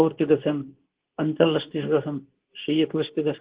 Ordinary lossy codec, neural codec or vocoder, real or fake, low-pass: Opus, 16 kbps; codec, 24 kHz, 0.9 kbps, WavTokenizer, medium speech release version 2; fake; 3.6 kHz